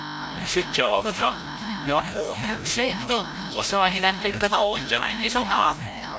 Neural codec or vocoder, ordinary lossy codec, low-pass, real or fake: codec, 16 kHz, 0.5 kbps, FreqCodec, larger model; none; none; fake